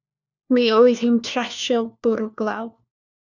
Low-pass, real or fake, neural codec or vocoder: 7.2 kHz; fake; codec, 16 kHz, 1 kbps, FunCodec, trained on LibriTTS, 50 frames a second